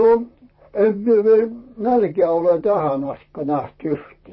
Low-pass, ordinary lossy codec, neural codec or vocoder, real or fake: 7.2 kHz; MP3, 24 kbps; vocoder, 44.1 kHz, 128 mel bands, Pupu-Vocoder; fake